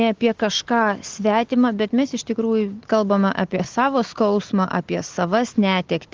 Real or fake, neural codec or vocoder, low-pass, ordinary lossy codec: real; none; 7.2 kHz; Opus, 16 kbps